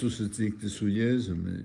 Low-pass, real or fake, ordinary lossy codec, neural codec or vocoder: 10.8 kHz; real; Opus, 32 kbps; none